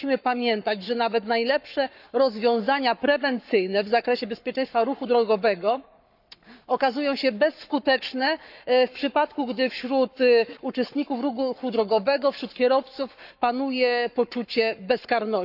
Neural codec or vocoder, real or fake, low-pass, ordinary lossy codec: codec, 44.1 kHz, 7.8 kbps, Pupu-Codec; fake; 5.4 kHz; none